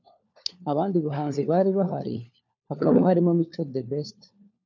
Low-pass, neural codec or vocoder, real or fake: 7.2 kHz; codec, 16 kHz, 4 kbps, FunCodec, trained on LibriTTS, 50 frames a second; fake